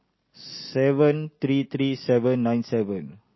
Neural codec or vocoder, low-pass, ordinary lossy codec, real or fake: none; 7.2 kHz; MP3, 24 kbps; real